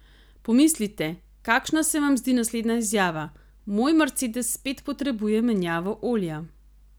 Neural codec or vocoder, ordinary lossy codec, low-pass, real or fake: none; none; none; real